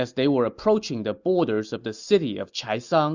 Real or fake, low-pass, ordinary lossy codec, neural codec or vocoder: real; 7.2 kHz; Opus, 64 kbps; none